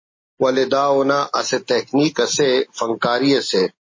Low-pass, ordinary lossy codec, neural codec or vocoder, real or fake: 7.2 kHz; MP3, 32 kbps; none; real